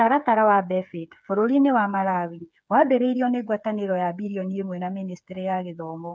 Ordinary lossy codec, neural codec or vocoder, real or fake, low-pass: none; codec, 16 kHz, 8 kbps, FreqCodec, smaller model; fake; none